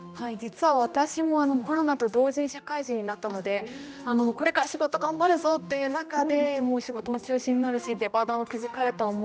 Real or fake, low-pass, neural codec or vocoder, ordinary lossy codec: fake; none; codec, 16 kHz, 1 kbps, X-Codec, HuBERT features, trained on general audio; none